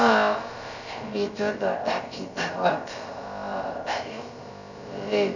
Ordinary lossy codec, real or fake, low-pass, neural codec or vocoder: none; fake; 7.2 kHz; codec, 16 kHz, about 1 kbps, DyCAST, with the encoder's durations